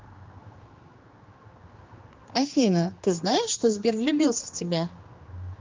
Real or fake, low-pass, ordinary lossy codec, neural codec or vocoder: fake; 7.2 kHz; Opus, 24 kbps; codec, 16 kHz, 2 kbps, X-Codec, HuBERT features, trained on general audio